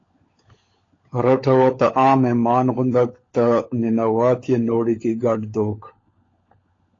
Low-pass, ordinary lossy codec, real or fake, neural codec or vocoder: 7.2 kHz; AAC, 32 kbps; fake; codec, 16 kHz, 16 kbps, FunCodec, trained on LibriTTS, 50 frames a second